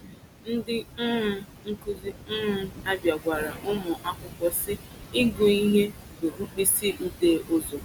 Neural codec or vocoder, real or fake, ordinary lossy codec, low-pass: none; real; none; 19.8 kHz